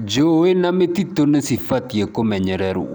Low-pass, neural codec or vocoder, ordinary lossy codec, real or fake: none; none; none; real